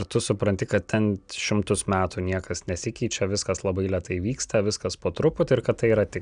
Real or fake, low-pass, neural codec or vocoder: real; 9.9 kHz; none